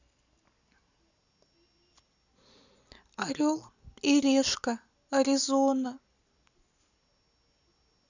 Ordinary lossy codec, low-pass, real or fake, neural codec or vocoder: none; 7.2 kHz; real; none